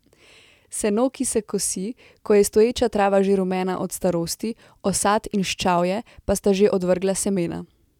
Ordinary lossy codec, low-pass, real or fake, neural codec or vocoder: none; 19.8 kHz; real; none